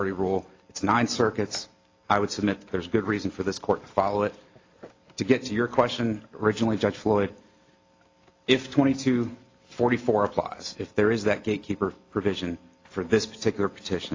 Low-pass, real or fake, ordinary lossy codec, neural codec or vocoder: 7.2 kHz; real; AAC, 32 kbps; none